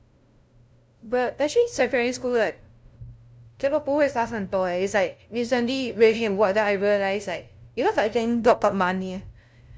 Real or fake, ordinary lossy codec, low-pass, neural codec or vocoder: fake; none; none; codec, 16 kHz, 0.5 kbps, FunCodec, trained on LibriTTS, 25 frames a second